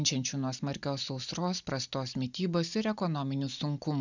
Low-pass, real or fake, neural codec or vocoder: 7.2 kHz; real; none